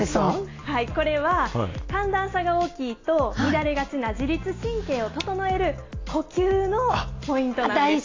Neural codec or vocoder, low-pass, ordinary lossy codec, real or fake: none; 7.2 kHz; AAC, 32 kbps; real